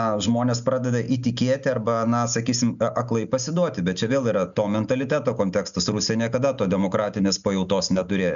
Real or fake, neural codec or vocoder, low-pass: real; none; 7.2 kHz